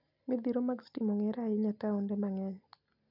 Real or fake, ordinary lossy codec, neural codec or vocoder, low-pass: real; none; none; 5.4 kHz